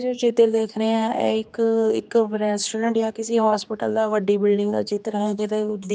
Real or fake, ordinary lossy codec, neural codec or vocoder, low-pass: fake; none; codec, 16 kHz, 2 kbps, X-Codec, HuBERT features, trained on general audio; none